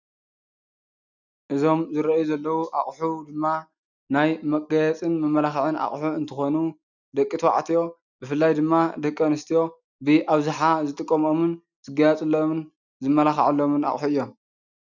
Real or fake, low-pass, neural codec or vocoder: real; 7.2 kHz; none